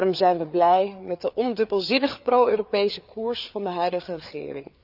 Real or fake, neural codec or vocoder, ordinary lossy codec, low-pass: fake; codec, 16 kHz, 4 kbps, FreqCodec, larger model; none; 5.4 kHz